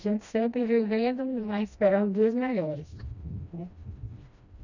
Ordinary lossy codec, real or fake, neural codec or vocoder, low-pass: none; fake; codec, 16 kHz, 1 kbps, FreqCodec, smaller model; 7.2 kHz